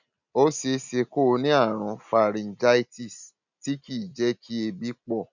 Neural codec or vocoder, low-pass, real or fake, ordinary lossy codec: none; 7.2 kHz; real; none